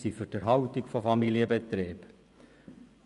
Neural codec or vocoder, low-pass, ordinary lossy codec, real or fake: vocoder, 24 kHz, 100 mel bands, Vocos; 10.8 kHz; none; fake